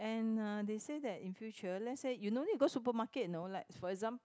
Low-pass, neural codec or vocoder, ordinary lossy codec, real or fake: none; none; none; real